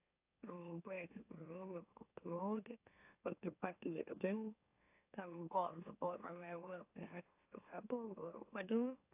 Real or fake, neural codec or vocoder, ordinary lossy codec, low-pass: fake; autoencoder, 44.1 kHz, a latent of 192 numbers a frame, MeloTTS; AAC, 32 kbps; 3.6 kHz